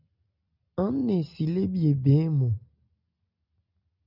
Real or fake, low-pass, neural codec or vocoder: real; 5.4 kHz; none